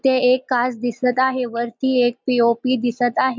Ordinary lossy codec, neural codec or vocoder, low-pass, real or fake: none; none; none; real